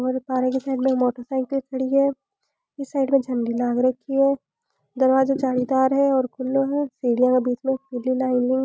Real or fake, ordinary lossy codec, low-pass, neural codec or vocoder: real; none; none; none